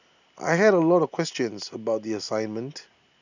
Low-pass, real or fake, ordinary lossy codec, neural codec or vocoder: 7.2 kHz; real; none; none